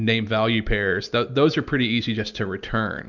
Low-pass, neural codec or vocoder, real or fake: 7.2 kHz; none; real